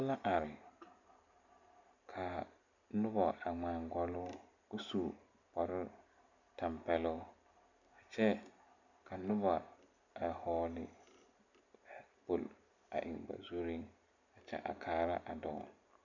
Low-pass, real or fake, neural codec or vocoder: 7.2 kHz; real; none